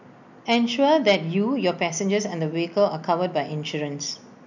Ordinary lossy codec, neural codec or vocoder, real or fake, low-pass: none; none; real; 7.2 kHz